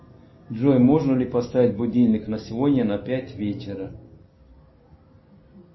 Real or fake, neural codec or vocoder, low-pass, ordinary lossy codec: real; none; 7.2 kHz; MP3, 24 kbps